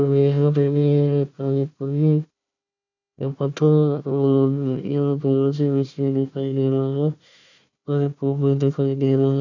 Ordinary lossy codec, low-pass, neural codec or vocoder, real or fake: none; 7.2 kHz; codec, 16 kHz, 1 kbps, FunCodec, trained on Chinese and English, 50 frames a second; fake